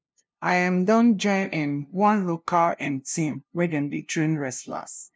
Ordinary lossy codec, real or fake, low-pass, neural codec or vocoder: none; fake; none; codec, 16 kHz, 0.5 kbps, FunCodec, trained on LibriTTS, 25 frames a second